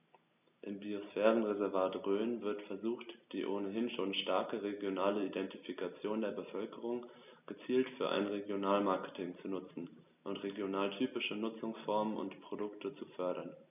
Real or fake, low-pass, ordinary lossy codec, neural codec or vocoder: real; 3.6 kHz; none; none